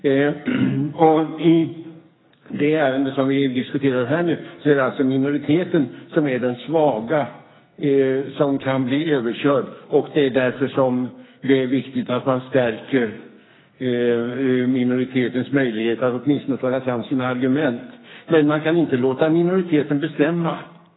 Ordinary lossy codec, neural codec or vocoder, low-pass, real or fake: AAC, 16 kbps; codec, 44.1 kHz, 2.6 kbps, SNAC; 7.2 kHz; fake